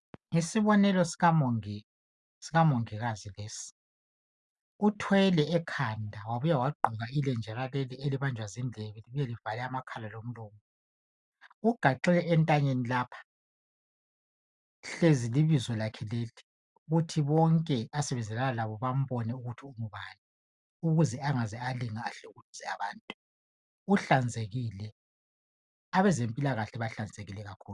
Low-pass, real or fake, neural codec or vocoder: 10.8 kHz; real; none